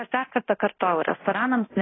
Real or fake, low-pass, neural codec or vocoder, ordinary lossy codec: fake; 7.2 kHz; codec, 24 kHz, 0.9 kbps, DualCodec; AAC, 16 kbps